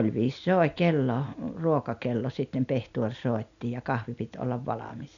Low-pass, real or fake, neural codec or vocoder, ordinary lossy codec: 7.2 kHz; real; none; none